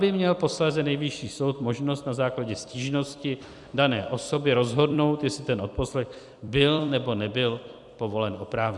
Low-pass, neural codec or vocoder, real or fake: 10.8 kHz; vocoder, 44.1 kHz, 128 mel bands every 256 samples, BigVGAN v2; fake